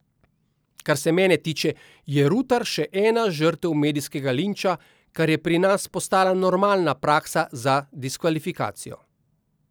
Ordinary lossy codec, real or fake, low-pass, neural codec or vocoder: none; real; none; none